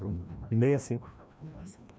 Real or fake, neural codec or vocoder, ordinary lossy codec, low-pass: fake; codec, 16 kHz, 1 kbps, FreqCodec, larger model; none; none